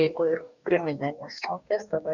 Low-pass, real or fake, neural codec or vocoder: 7.2 kHz; fake; codec, 44.1 kHz, 2.6 kbps, DAC